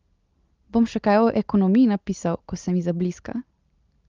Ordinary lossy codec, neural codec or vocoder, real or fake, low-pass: Opus, 32 kbps; none; real; 7.2 kHz